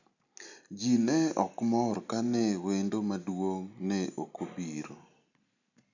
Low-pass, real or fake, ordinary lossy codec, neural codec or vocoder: 7.2 kHz; real; none; none